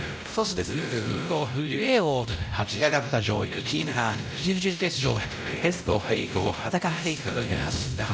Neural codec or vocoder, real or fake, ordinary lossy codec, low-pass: codec, 16 kHz, 0.5 kbps, X-Codec, WavLM features, trained on Multilingual LibriSpeech; fake; none; none